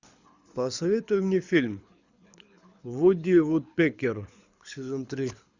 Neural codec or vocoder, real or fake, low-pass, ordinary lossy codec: codec, 24 kHz, 6 kbps, HILCodec; fake; 7.2 kHz; Opus, 64 kbps